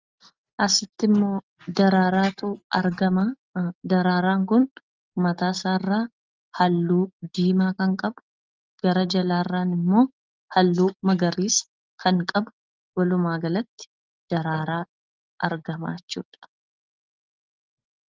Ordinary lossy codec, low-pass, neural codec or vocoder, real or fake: Opus, 32 kbps; 7.2 kHz; none; real